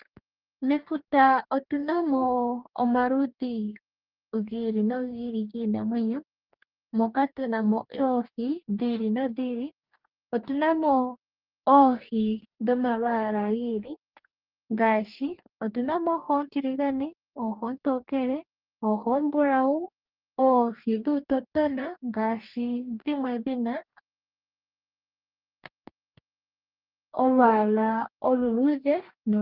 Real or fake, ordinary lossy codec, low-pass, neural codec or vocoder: fake; Opus, 32 kbps; 5.4 kHz; codec, 44.1 kHz, 2.6 kbps, DAC